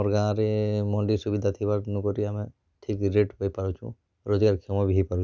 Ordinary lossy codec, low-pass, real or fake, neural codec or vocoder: none; none; real; none